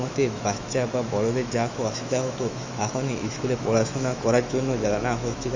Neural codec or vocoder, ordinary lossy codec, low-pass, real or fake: autoencoder, 48 kHz, 128 numbers a frame, DAC-VAE, trained on Japanese speech; MP3, 64 kbps; 7.2 kHz; fake